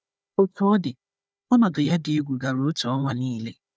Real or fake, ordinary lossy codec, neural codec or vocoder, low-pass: fake; none; codec, 16 kHz, 4 kbps, FunCodec, trained on Chinese and English, 50 frames a second; none